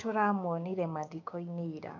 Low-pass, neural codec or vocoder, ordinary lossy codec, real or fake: 7.2 kHz; codec, 16 kHz, 6 kbps, DAC; none; fake